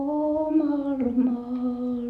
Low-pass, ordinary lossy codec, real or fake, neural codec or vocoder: 14.4 kHz; none; real; none